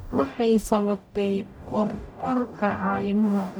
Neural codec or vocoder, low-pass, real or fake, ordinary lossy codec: codec, 44.1 kHz, 0.9 kbps, DAC; none; fake; none